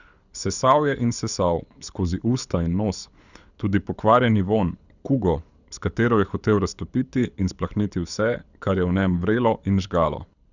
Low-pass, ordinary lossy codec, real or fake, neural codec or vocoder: 7.2 kHz; none; fake; codec, 24 kHz, 6 kbps, HILCodec